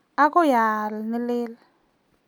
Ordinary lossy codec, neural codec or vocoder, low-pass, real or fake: none; none; 19.8 kHz; real